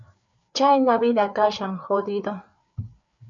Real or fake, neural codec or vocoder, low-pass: fake; codec, 16 kHz, 4 kbps, FreqCodec, larger model; 7.2 kHz